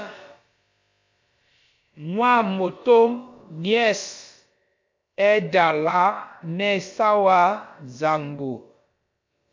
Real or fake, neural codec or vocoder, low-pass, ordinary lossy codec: fake; codec, 16 kHz, about 1 kbps, DyCAST, with the encoder's durations; 7.2 kHz; MP3, 48 kbps